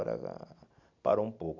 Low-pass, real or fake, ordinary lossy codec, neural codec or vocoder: 7.2 kHz; real; none; none